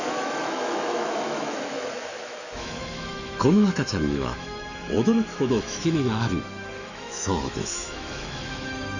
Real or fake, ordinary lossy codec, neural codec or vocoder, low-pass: fake; none; autoencoder, 48 kHz, 128 numbers a frame, DAC-VAE, trained on Japanese speech; 7.2 kHz